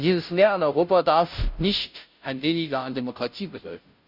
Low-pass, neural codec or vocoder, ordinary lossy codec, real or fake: 5.4 kHz; codec, 16 kHz, 0.5 kbps, FunCodec, trained on Chinese and English, 25 frames a second; none; fake